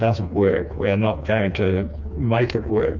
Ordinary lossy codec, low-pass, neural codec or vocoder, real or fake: MP3, 64 kbps; 7.2 kHz; codec, 16 kHz, 2 kbps, FreqCodec, smaller model; fake